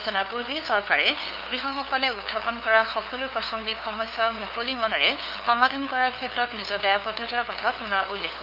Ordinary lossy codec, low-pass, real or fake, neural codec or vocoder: none; 5.4 kHz; fake; codec, 16 kHz, 2 kbps, FunCodec, trained on LibriTTS, 25 frames a second